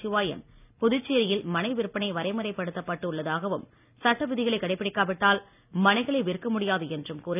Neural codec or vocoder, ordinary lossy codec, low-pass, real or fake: none; none; 3.6 kHz; real